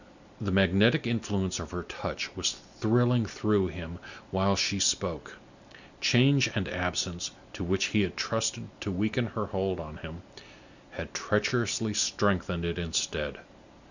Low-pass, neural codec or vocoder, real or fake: 7.2 kHz; none; real